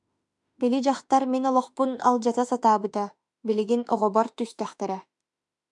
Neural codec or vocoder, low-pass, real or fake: autoencoder, 48 kHz, 32 numbers a frame, DAC-VAE, trained on Japanese speech; 10.8 kHz; fake